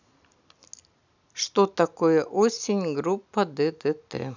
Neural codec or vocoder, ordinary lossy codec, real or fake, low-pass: none; none; real; 7.2 kHz